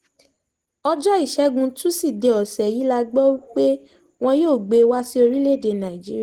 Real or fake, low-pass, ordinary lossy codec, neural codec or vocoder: real; 19.8 kHz; Opus, 16 kbps; none